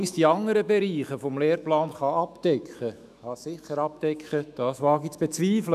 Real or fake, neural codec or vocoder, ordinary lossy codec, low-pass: fake; autoencoder, 48 kHz, 128 numbers a frame, DAC-VAE, trained on Japanese speech; none; 14.4 kHz